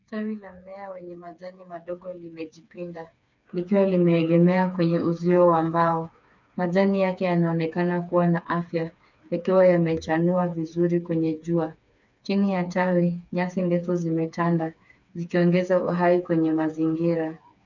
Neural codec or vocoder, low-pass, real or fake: codec, 16 kHz, 4 kbps, FreqCodec, smaller model; 7.2 kHz; fake